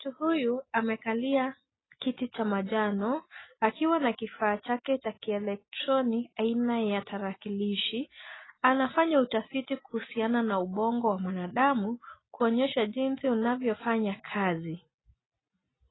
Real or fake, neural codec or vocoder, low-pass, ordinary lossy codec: real; none; 7.2 kHz; AAC, 16 kbps